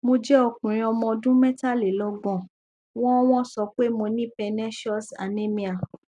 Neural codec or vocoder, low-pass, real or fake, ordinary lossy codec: none; 10.8 kHz; real; none